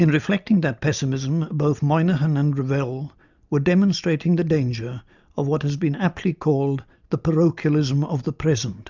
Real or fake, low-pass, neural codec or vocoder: real; 7.2 kHz; none